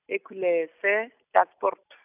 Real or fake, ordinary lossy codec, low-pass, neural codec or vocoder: real; none; 3.6 kHz; none